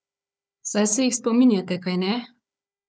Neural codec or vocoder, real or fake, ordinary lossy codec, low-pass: codec, 16 kHz, 4 kbps, FunCodec, trained on Chinese and English, 50 frames a second; fake; none; none